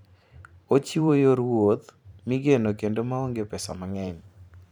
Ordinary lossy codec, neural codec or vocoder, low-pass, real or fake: none; vocoder, 44.1 kHz, 128 mel bands every 512 samples, BigVGAN v2; 19.8 kHz; fake